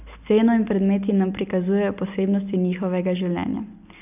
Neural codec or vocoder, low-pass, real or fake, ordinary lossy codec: none; 3.6 kHz; real; none